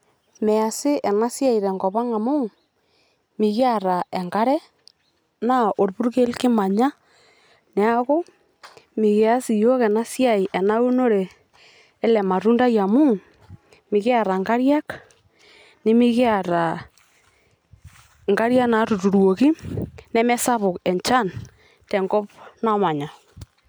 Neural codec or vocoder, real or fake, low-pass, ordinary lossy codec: none; real; none; none